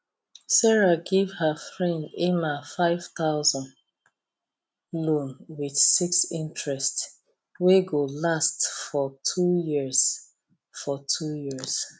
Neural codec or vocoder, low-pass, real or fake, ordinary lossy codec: none; none; real; none